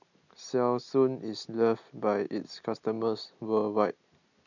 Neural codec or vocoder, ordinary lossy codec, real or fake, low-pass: none; none; real; 7.2 kHz